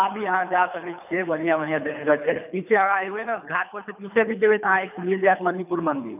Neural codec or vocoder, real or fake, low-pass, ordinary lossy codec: codec, 24 kHz, 3 kbps, HILCodec; fake; 3.6 kHz; none